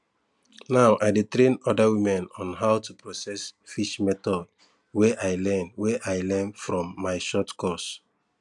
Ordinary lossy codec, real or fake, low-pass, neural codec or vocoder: none; real; 10.8 kHz; none